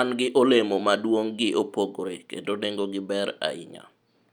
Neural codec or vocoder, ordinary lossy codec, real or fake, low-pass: none; none; real; 19.8 kHz